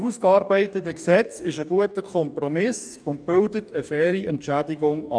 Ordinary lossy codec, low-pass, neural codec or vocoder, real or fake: none; 9.9 kHz; codec, 44.1 kHz, 2.6 kbps, DAC; fake